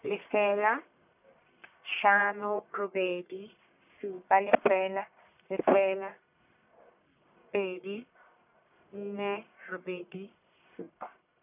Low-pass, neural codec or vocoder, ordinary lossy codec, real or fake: 3.6 kHz; codec, 44.1 kHz, 1.7 kbps, Pupu-Codec; none; fake